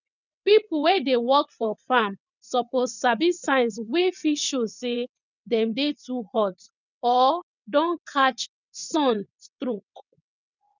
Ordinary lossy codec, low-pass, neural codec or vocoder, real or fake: none; 7.2 kHz; vocoder, 22.05 kHz, 80 mel bands, WaveNeXt; fake